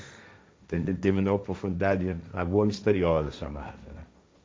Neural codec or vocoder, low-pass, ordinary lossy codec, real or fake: codec, 16 kHz, 1.1 kbps, Voila-Tokenizer; none; none; fake